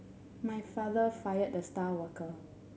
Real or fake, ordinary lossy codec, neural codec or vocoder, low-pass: real; none; none; none